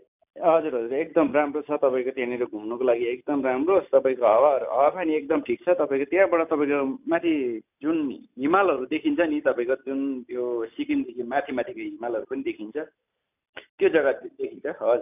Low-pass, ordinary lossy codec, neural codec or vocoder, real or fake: 3.6 kHz; none; none; real